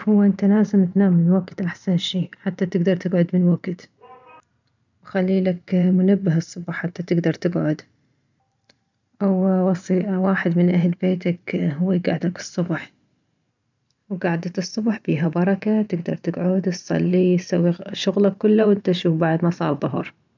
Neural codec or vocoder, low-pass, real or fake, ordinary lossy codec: vocoder, 44.1 kHz, 128 mel bands every 512 samples, BigVGAN v2; 7.2 kHz; fake; none